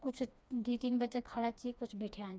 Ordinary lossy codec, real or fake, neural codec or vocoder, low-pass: none; fake; codec, 16 kHz, 2 kbps, FreqCodec, smaller model; none